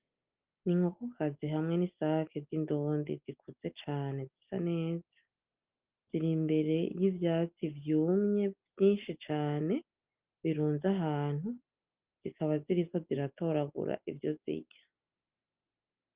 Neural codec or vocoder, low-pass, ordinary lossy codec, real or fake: none; 3.6 kHz; Opus, 24 kbps; real